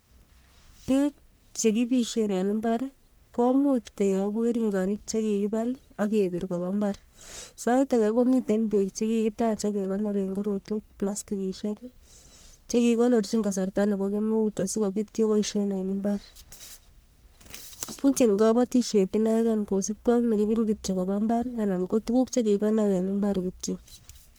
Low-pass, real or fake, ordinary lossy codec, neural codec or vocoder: none; fake; none; codec, 44.1 kHz, 1.7 kbps, Pupu-Codec